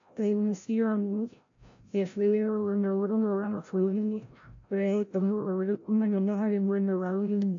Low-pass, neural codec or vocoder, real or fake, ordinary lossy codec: 7.2 kHz; codec, 16 kHz, 0.5 kbps, FreqCodec, larger model; fake; AAC, 64 kbps